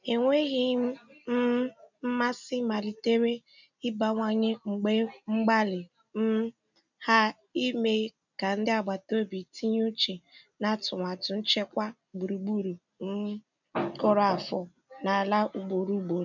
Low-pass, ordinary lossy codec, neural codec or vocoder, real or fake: 7.2 kHz; none; none; real